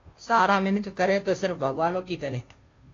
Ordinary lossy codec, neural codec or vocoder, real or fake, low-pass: AAC, 32 kbps; codec, 16 kHz, 0.5 kbps, FunCodec, trained on Chinese and English, 25 frames a second; fake; 7.2 kHz